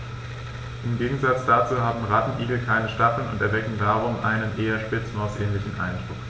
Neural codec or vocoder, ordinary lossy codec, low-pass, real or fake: none; none; none; real